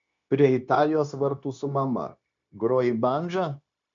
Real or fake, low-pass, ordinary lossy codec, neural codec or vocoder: fake; 7.2 kHz; MP3, 64 kbps; codec, 16 kHz, 0.9 kbps, LongCat-Audio-Codec